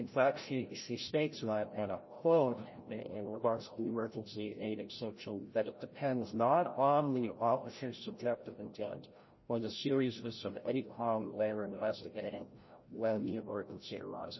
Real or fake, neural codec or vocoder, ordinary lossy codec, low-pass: fake; codec, 16 kHz, 0.5 kbps, FreqCodec, larger model; MP3, 24 kbps; 7.2 kHz